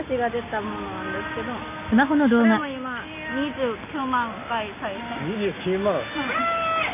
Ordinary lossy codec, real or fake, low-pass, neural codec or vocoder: none; real; 3.6 kHz; none